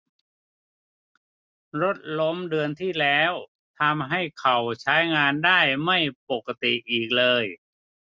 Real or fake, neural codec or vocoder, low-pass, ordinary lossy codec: real; none; none; none